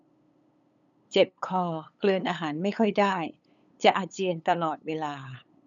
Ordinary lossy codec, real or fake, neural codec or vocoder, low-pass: none; fake; codec, 16 kHz, 8 kbps, FunCodec, trained on LibriTTS, 25 frames a second; 7.2 kHz